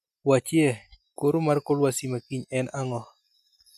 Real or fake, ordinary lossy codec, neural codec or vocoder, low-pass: real; none; none; 14.4 kHz